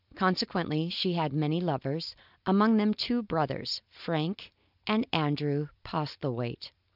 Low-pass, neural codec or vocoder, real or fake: 5.4 kHz; none; real